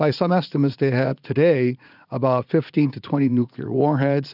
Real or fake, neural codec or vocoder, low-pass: fake; vocoder, 44.1 kHz, 80 mel bands, Vocos; 5.4 kHz